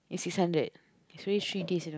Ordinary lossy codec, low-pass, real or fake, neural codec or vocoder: none; none; real; none